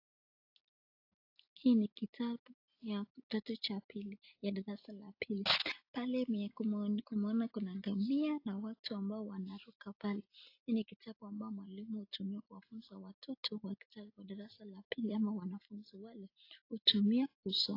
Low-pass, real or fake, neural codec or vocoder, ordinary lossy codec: 5.4 kHz; real; none; AAC, 32 kbps